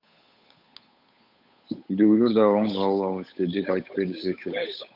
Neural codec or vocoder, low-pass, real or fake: codec, 16 kHz, 8 kbps, FunCodec, trained on Chinese and English, 25 frames a second; 5.4 kHz; fake